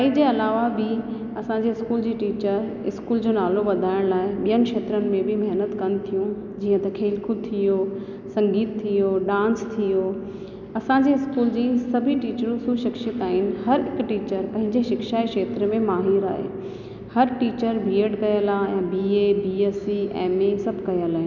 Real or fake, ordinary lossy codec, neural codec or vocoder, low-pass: real; none; none; 7.2 kHz